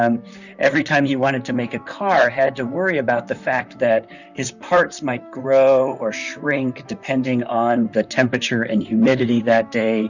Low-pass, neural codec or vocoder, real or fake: 7.2 kHz; vocoder, 44.1 kHz, 128 mel bands, Pupu-Vocoder; fake